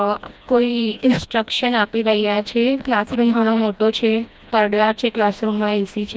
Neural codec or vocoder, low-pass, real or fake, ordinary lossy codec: codec, 16 kHz, 1 kbps, FreqCodec, smaller model; none; fake; none